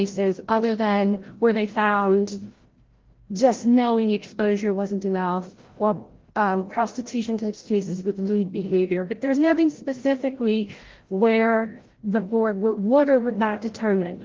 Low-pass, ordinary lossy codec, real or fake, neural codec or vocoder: 7.2 kHz; Opus, 16 kbps; fake; codec, 16 kHz, 0.5 kbps, FreqCodec, larger model